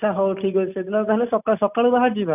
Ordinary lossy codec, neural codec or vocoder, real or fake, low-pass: none; none; real; 3.6 kHz